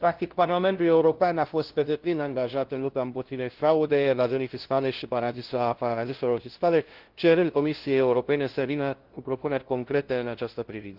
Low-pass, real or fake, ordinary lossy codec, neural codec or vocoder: 5.4 kHz; fake; Opus, 16 kbps; codec, 16 kHz, 0.5 kbps, FunCodec, trained on LibriTTS, 25 frames a second